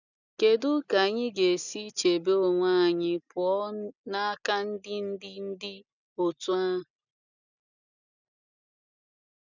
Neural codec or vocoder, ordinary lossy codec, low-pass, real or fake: none; none; 7.2 kHz; real